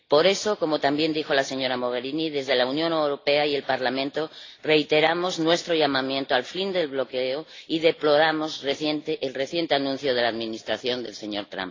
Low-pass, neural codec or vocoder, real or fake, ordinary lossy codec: 7.2 kHz; none; real; AAC, 32 kbps